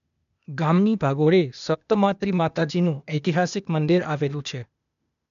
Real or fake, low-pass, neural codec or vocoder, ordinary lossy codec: fake; 7.2 kHz; codec, 16 kHz, 0.8 kbps, ZipCodec; none